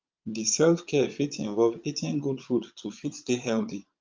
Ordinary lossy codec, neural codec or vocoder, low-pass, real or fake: Opus, 24 kbps; vocoder, 22.05 kHz, 80 mel bands, Vocos; 7.2 kHz; fake